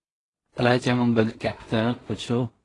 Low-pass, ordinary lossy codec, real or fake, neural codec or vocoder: 10.8 kHz; AAC, 32 kbps; fake; codec, 16 kHz in and 24 kHz out, 0.4 kbps, LongCat-Audio-Codec, two codebook decoder